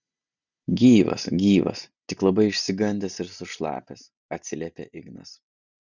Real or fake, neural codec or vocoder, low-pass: real; none; 7.2 kHz